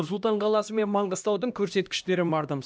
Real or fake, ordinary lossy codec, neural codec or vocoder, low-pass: fake; none; codec, 16 kHz, 1 kbps, X-Codec, HuBERT features, trained on LibriSpeech; none